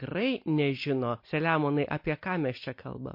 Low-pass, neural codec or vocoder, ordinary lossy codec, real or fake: 5.4 kHz; none; MP3, 32 kbps; real